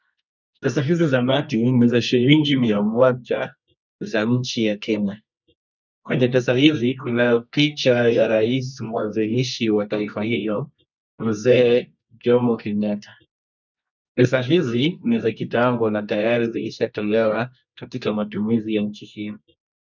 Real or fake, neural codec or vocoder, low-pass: fake; codec, 24 kHz, 0.9 kbps, WavTokenizer, medium music audio release; 7.2 kHz